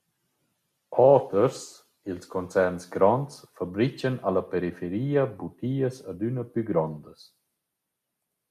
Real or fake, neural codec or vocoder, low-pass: real; none; 14.4 kHz